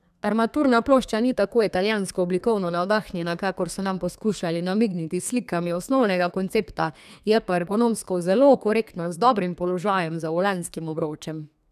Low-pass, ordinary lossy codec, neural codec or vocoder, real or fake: 14.4 kHz; none; codec, 32 kHz, 1.9 kbps, SNAC; fake